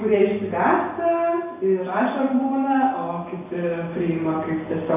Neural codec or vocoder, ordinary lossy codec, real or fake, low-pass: none; AAC, 24 kbps; real; 3.6 kHz